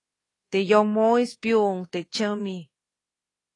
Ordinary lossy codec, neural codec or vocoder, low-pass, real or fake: AAC, 32 kbps; codec, 24 kHz, 1.2 kbps, DualCodec; 10.8 kHz; fake